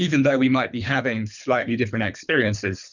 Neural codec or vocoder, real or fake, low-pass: codec, 24 kHz, 3 kbps, HILCodec; fake; 7.2 kHz